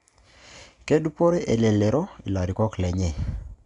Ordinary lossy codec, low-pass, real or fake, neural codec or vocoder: none; 10.8 kHz; real; none